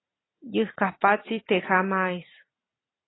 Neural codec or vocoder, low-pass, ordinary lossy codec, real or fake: none; 7.2 kHz; AAC, 16 kbps; real